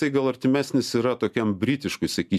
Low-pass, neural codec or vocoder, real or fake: 14.4 kHz; none; real